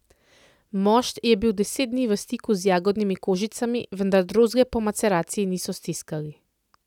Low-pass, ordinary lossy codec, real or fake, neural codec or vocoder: 19.8 kHz; none; real; none